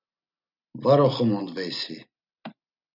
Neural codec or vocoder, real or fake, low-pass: none; real; 5.4 kHz